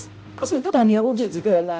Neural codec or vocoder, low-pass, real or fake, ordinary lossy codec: codec, 16 kHz, 0.5 kbps, X-Codec, HuBERT features, trained on balanced general audio; none; fake; none